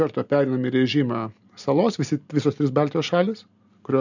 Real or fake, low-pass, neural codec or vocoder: real; 7.2 kHz; none